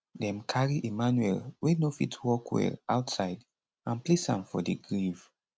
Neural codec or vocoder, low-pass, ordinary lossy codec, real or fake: none; none; none; real